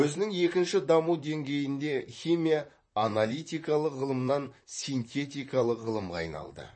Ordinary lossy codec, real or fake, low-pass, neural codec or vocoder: MP3, 32 kbps; fake; 9.9 kHz; vocoder, 44.1 kHz, 128 mel bands, Pupu-Vocoder